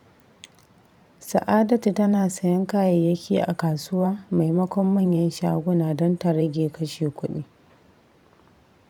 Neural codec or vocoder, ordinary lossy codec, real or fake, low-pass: vocoder, 44.1 kHz, 128 mel bands every 512 samples, BigVGAN v2; none; fake; 19.8 kHz